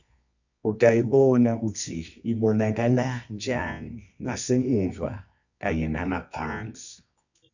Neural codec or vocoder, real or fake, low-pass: codec, 24 kHz, 0.9 kbps, WavTokenizer, medium music audio release; fake; 7.2 kHz